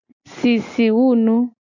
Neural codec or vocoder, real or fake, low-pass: none; real; 7.2 kHz